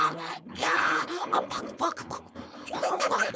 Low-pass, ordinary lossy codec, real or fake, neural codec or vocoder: none; none; fake; codec, 16 kHz, 4.8 kbps, FACodec